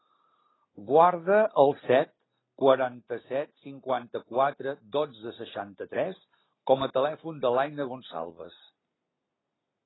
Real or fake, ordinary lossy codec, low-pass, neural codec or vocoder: real; AAC, 16 kbps; 7.2 kHz; none